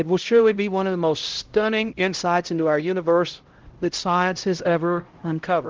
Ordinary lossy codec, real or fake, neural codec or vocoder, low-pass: Opus, 16 kbps; fake; codec, 16 kHz, 0.5 kbps, X-Codec, HuBERT features, trained on LibriSpeech; 7.2 kHz